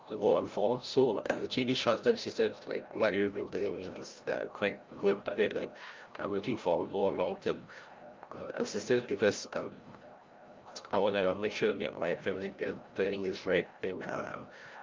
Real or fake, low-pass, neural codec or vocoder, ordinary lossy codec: fake; 7.2 kHz; codec, 16 kHz, 0.5 kbps, FreqCodec, larger model; Opus, 24 kbps